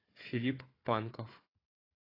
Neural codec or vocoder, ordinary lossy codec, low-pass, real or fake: codec, 16 kHz, 4 kbps, FunCodec, trained on Chinese and English, 50 frames a second; AAC, 24 kbps; 5.4 kHz; fake